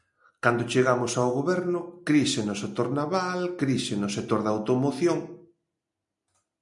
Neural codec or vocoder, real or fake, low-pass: none; real; 10.8 kHz